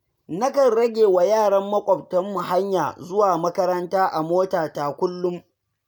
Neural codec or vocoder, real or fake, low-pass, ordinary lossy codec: none; real; none; none